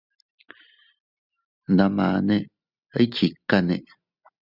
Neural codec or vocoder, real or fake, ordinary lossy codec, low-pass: none; real; Opus, 64 kbps; 5.4 kHz